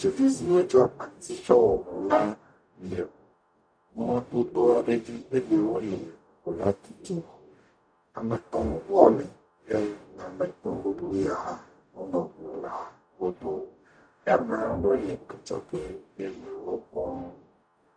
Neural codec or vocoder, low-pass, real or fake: codec, 44.1 kHz, 0.9 kbps, DAC; 9.9 kHz; fake